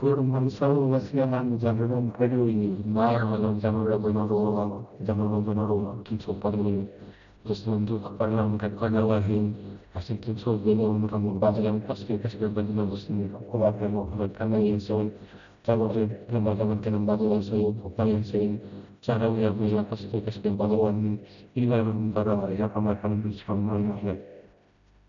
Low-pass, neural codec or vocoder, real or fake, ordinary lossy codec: 7.2 kHz; codec, 16 kHz, 0.5 kbps, FreqCodec, smaller model; fake; MP3, 96 kbps